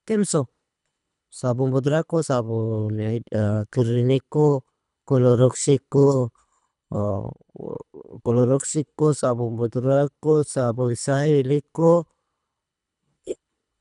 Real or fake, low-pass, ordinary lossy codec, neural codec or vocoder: fake; 10.8 kHz; none; codec, 24 kHz, 3 kbps, HILCodec